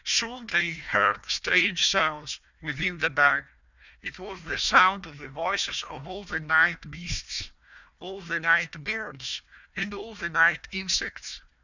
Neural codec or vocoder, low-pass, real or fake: codec, 16 kHz, 1 kbps, FunCodec, trained on Chinese and English, 50 frames a second; 7.2 kHz; fake